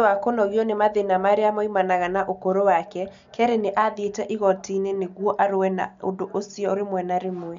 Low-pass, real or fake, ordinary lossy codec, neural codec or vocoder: 7.2 kHz; real; MP3, 64 kbps; none